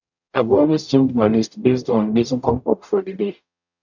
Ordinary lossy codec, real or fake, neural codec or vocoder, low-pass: none; fake; codec, 44.1 kHz, 0.9 kbps, DAC; 7.2 kHz